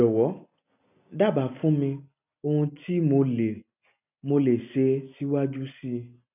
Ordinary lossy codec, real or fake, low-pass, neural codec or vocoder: none; real; 3.6 kHz; none